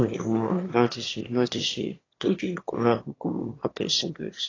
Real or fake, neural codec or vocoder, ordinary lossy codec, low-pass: fake; autoencoder, 22.05 kHz, a latent of 192 numbers a frame, VITS, trained on one speaker; AAC, 32 kbps; 7.2 kHz